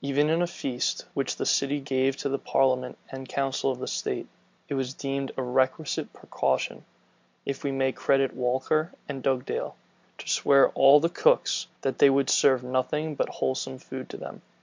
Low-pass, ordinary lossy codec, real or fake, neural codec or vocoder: 7.2 kHz; MP3, 64 kbps; real; none